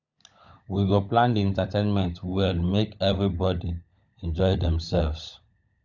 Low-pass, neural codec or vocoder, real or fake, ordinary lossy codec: 7.2 kHz; codec, 16 kHz, 16 kbps, FunCodec, trained on LibriTTS, 50 frames a second; fake; none